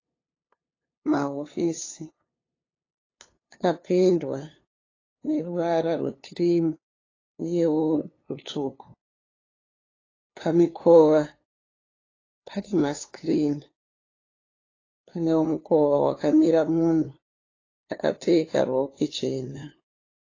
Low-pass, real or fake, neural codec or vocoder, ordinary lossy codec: 7.2 kHz; fake; codec, 16 kHz, 2 kbps, FunCodec, trained on LibriTTS, 25 frames a second; AAC, 32 kbps